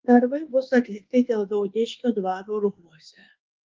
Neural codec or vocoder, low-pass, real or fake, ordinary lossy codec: codec, 24 kHz, 1.2 kbps, DualCodec; 7.2 kHz; fake; Opus, 16 kbps